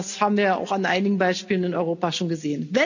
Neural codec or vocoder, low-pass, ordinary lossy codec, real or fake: none; 7.2 kHz; none; real